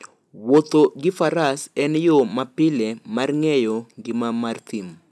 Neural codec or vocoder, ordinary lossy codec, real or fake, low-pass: none; none; real; none